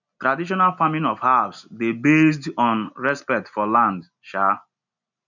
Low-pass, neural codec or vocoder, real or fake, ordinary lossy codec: 7.2 kHz; none; real; none